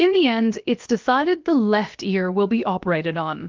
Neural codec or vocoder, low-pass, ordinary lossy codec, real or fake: codec, 16 kHz, about 1 kbps, DyCAST, with the encoder's durations; 7.2 kHz; Opus, 24 kbps; fake